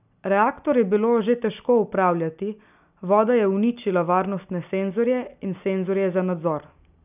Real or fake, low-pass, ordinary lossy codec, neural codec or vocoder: real; 3.6 kHz; none; none